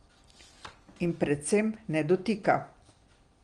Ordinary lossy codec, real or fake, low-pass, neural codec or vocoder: Opus, 24 kbps; real; 9.9 kHz; none